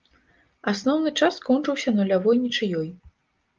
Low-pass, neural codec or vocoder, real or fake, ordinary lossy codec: 7.2 kHz; none; real; Opus, 24 kbps